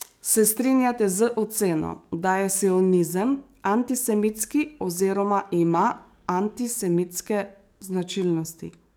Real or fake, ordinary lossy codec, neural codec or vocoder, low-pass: fake; none; codec, 44.1 kHz, 7.8 kbps, DAC; none